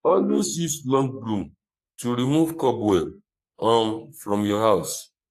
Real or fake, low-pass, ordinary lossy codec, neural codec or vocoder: fake; 14.4 kHz; AAC, 64 kbps; codec, 44.1 kHz, 3.4 kbps, Pupu-Codec